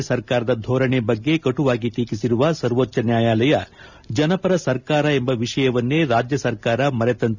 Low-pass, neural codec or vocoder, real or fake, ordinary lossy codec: 7.2 kHz; none; real; none